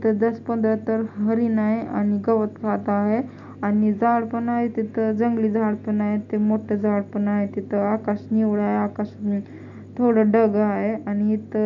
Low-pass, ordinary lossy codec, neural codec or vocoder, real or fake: 7.2 kHz; MP3, 64 kbps; none; real